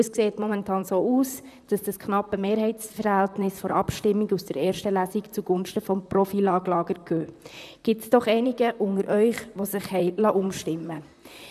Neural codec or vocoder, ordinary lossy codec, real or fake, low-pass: vocoder, 44.1 kHz, 128 mel bands, Pupu-Vocoder; none; fake; 14.4 kHz